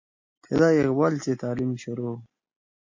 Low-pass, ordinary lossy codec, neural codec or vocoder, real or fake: 7.2 kHz; MP3, 48 kbps; none; real